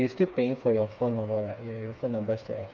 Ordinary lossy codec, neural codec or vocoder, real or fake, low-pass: none; codec, 16 kHz, 4 kbps, FreqCodec, smaller model; fake; none